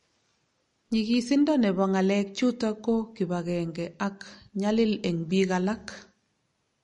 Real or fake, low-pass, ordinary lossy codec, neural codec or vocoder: real; 19.8 kHz; MP3, 48 kbps; none